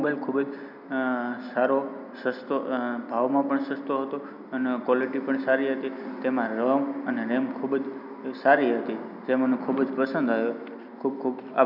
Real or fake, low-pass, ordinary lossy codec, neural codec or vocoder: real; 5.4 kHz; none; none